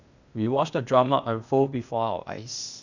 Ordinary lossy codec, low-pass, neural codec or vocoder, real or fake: none; 7.2 kHz; codec, 16 kHz, 0.8 kbps, ZipCodec; fake